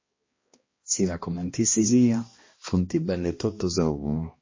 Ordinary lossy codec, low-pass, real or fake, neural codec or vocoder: MP3, 32 kbps; 7.2 kHz; fake; codec, 16 kHz, 1 kbps, X-Codec, HuBERT features, trained on balanced general audio